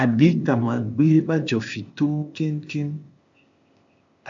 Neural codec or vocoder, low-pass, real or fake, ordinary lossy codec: codec, 16 kHz, 0.8 kbps, ZipCodec; 7.2 kHz; fake; AAC, 64 kbps